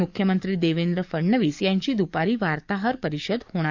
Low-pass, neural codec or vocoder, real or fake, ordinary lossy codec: 7.2 kHz; codec, 44.1 kHz, 7.8 kbps, DAC; fake; Opus, 64 kbps